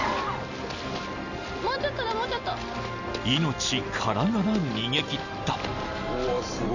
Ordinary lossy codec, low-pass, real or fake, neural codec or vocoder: none; 7.2 kHz; real; none